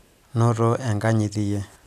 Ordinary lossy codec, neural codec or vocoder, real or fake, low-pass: none; none; real; 14.4 kHz